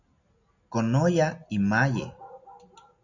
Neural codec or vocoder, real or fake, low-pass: none; real; 7.2 kHz